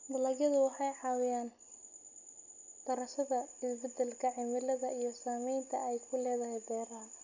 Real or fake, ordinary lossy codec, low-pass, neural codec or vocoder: real; none; 7.2 kHz; none